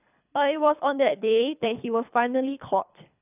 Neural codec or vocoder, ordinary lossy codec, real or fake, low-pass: codec, 24 kHz, 3 kbps, HILCodec; none; fake; 3.6 kHz